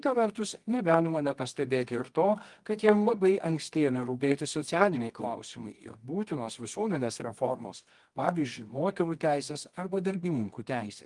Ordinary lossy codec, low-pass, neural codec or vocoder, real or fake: Opus, 24 kbps; 10.8 kHz; codec, 24 kHz, 0.9 kbps, WavTokenizer, medium music audio release; fake